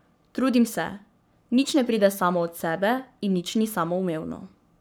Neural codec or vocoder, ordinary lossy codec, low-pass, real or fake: codec, 44.1 kHz, 7.8 kbps, Pupu-Codec; none; none; fake